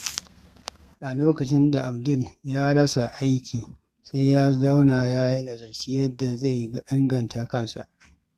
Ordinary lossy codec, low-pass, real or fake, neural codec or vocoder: Opus, 64 kbps; 14.4 kHz; fake; codec, 32 kHz, 1.9 kbps, SNAC